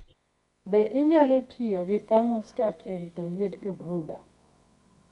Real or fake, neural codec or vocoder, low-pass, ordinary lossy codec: fake; codec, 24 kHz, 0.9 kbps, WavTokenizer, medium music audio release; 10.8 kHz; MP3, 64 kbps